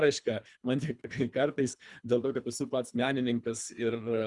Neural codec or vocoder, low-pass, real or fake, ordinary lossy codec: codec, 24 kHz, 3 kbps, HILCodec; 10.8 kHz; fake; Opus, 64 kbps